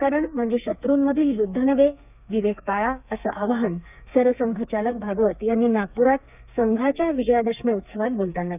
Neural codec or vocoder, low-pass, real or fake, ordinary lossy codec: codec, 32 kHz, 1.9 kbps, SNAC; 3.6 kHz; fake; none